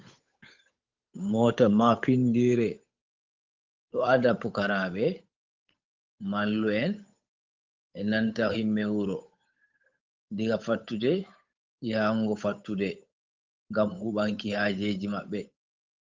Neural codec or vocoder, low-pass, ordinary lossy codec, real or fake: codec, 16 kHz, 8 kbps, FunCodec, trained on Chinese and English, 25 frames a second; 7.2 kHz; Opus, 24 kbps; fake